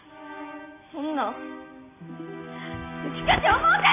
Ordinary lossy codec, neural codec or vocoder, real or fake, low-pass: AAC, 32 kbps; none; real; 3.6 kHz